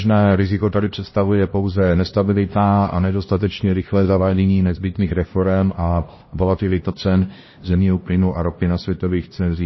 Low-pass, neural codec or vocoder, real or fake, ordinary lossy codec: 7.2 kHz; codec, 16 kHz, 1 kbps, X-Codec, HuBERT features, trained on LibriSpeech; fake; MP3, 24 kbps